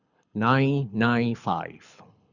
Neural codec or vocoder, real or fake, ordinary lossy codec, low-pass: codec, 24 kHz, 6 kbps, HILCodec; fake; none; 7.2 kHz